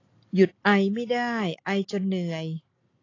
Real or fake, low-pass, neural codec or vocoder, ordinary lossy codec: real; 7.2 kHz; none; AAC, 32 kbps